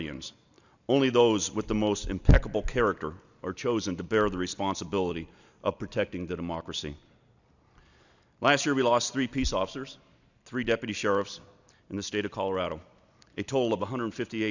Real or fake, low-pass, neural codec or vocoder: real; 7.2 kHz; none